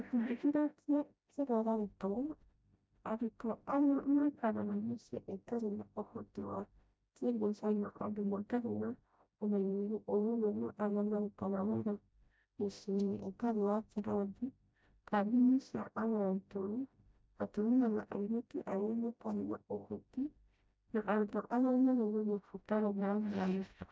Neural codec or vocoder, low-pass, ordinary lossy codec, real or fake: codec, 16 kHz, 0.5 kbps, FreqCodec, smaller model; none; none; fake